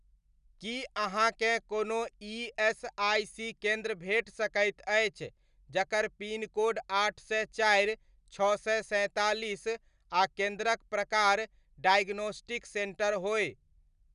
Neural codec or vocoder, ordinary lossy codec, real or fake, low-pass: none; none; real; 10.8 kHz